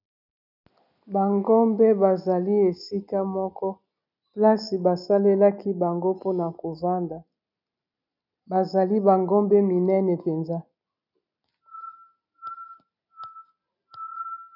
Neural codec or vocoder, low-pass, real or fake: none; 5.4 kHz; real